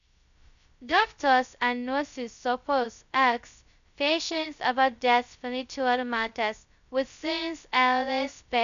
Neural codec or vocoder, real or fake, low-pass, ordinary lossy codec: codec, 16 kHz, 0.2 kbps, FocalCodec; fake; 7.2 kHz; none